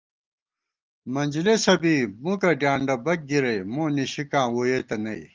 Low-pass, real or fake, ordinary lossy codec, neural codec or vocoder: 7.2 kHz; real; Opus, 32 kbps; none